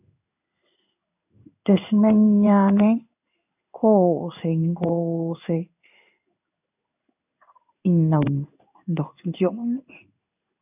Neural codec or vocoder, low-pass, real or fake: codec, 16 kHz in and 24 kHz out, 1 kbps, XY-Tokenizer; 3.6 kHz; fake